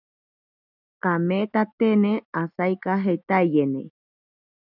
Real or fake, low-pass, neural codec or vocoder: real; 5.4 kHz; none